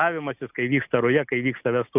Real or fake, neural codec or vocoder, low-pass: real; none; 3.6 kHz